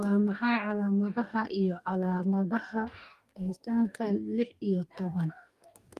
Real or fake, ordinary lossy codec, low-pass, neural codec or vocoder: fake; Opus, 32 kbps; 14.4 kHz; codec, 44.1 kHz, 2.6 kbps, DAC